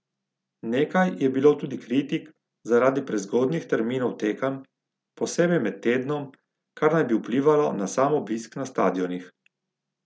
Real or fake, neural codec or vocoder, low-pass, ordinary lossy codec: real; none; none; none